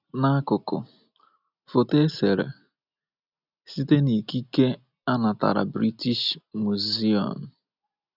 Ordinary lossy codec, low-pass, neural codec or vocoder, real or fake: none; 5.4 kHz; none; real